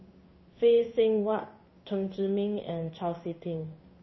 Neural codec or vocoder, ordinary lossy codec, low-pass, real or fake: codec, 16 kHz in and 24 kHz out, 1 kbps, XY-Tokenizer; MP3, 24 kbps; 7.2 kHz; fake